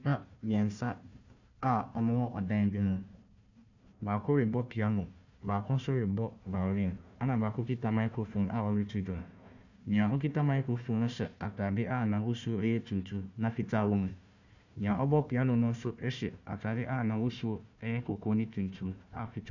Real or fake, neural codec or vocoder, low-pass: fake; codec, 16 kHz, 1 kbps, FunCodec, trained on Chinese and English, 50 frames a second; 7.2 kHz